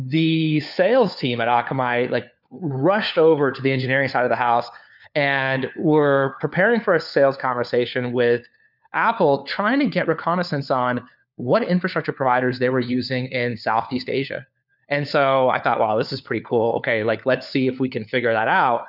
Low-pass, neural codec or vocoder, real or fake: 5.4 kHz; codec, 16 kHz, 4 kbps, FunCodec, trained on LibriTTS, 50 frames a second; fake